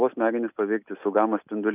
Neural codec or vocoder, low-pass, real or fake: none; 3.6 kHz; real